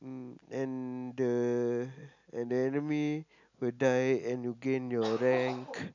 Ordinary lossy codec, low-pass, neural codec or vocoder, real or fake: none; 7.2 kHz; none; real